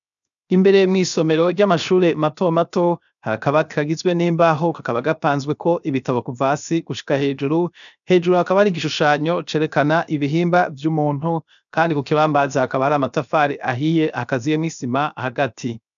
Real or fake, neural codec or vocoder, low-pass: fake; codec, 16 kHz, 0.7 kbps, FocalCodec; 7.2 kHz